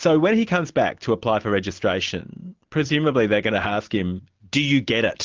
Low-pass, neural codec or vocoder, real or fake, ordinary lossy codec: 7.2 kHz; none; real; Opus, 32 kbps